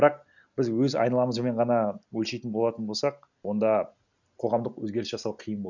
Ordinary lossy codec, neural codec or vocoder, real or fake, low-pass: none; none; real; 7.2 kHz